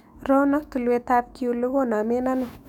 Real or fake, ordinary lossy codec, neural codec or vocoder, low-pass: fake; none; autoencoder, 48 kHz, 128 numbers a frame, DAC-VAE, trained on Japanese speech; 19.8 kHz